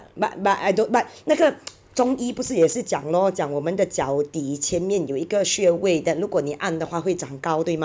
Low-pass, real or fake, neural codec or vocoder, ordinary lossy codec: none; real; none; none